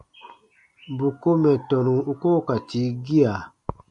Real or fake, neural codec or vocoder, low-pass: real; none; 10.8 kHz